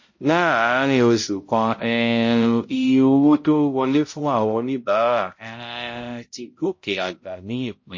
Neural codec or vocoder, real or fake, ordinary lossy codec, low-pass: codec, 16 kHz, 0.5 kbps, X-Codec, HuBERT features, trained on balanced general audio; fake; MP3, 32 kbps; 7.2 kHz